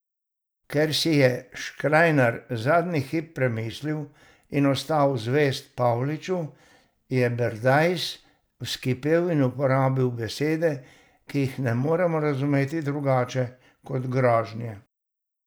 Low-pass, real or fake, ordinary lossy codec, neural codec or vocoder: none; real; none; none